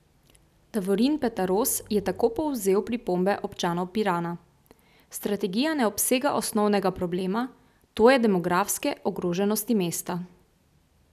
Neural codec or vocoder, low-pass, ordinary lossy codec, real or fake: none; 14.4 kHz; none; real